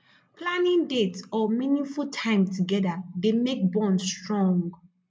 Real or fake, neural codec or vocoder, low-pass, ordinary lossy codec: real; none; none; none